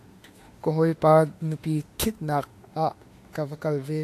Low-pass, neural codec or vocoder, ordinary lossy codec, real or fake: 14.4 kHz; autoencoder, 48 kHz, 32 numbers a frame, DAC-VAE, trained on Japanese speech; AAC, 64 kbps; fake